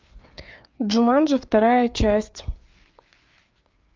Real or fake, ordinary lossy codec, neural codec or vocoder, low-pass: fake; Opus, 24 kbps; codec, 16 kHz, 4 kbps, FreqCodec, larger model; 7.2 kHz